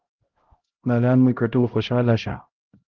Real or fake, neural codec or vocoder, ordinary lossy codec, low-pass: fake; codec, 16 kHz, 0.5 kbps, X-Codec, HuBERT features, trained on LibriSpeech; Opus, 16 kbps; 7.2 kHz